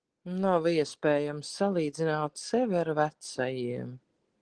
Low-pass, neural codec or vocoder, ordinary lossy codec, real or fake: 9.9 kHz; none; Opus, 16 kbps; real